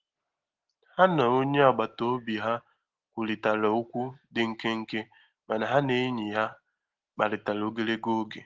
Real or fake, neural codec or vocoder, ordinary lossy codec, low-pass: real; none; Opus, 16 kbps; 7.2 kHz